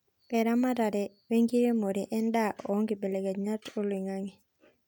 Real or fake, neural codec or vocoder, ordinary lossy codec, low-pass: real; none; none; 19.8 kHz